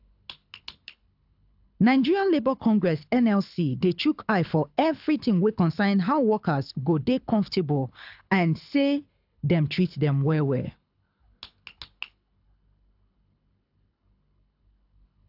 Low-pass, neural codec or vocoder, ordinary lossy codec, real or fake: 5.4 kHz; codec, 24 kHz, 6 kbps, HILCodec; AAC, 48 kbps; fake